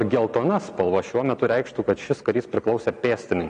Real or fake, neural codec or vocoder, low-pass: real; none; 9.9 kHz